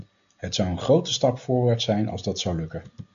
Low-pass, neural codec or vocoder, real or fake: 7.2 kHz; none; real